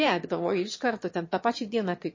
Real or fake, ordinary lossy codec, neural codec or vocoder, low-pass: fake; MP3, 32 kbps; autoencoder, 22.05 kHz, a latent of 192 numbers a frame, VITS, trained on one speaker; 7.2 kHz